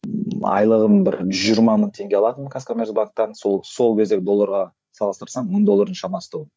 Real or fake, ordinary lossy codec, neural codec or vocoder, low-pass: fake; none; codec, 16 kHz, 8 kbps, FreqCodec, larger model; none